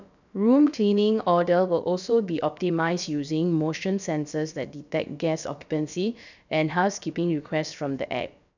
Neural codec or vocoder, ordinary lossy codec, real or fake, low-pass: codec, 16 kHz, about 1 kbps, DyCAST, with the encoder's durations; none; fake; 7.2 kHz